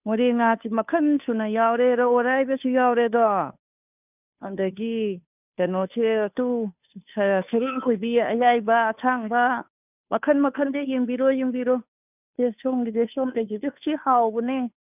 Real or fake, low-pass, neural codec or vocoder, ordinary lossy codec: fake; 3.6 kHz; codec, 16 kHz, 2 kbps, FunCodec, trained on Chinese and English, 25 frames a second; none